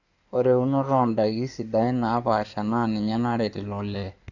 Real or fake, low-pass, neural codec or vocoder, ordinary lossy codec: fake; 7.2 kHz; codec, 16 kHz in and 24 kHz out, 2.2 kbps, FireRedTTS-2 codec; none